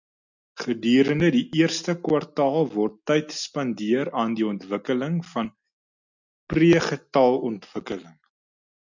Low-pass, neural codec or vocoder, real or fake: 7.2 kHz; none; real